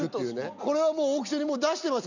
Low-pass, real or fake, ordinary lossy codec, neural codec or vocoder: 7.2 kHz; real; none; none